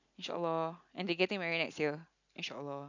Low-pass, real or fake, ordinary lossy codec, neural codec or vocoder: 7.2 kHz; real; none; none